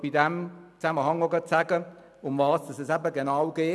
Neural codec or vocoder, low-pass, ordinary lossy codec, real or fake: none; none; none; real